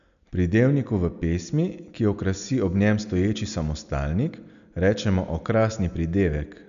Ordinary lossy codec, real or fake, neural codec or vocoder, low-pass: none; real; none; 7.2 kHz